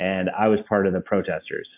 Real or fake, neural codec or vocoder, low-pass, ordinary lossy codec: real; none; 3.6 kHz; AAC, 32 kbps